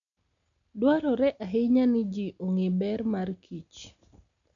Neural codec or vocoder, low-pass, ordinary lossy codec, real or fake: none; 7.2 kHz; none; real